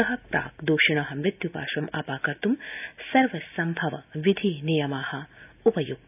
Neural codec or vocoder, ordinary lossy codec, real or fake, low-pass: none; none; real; 3.6 kHz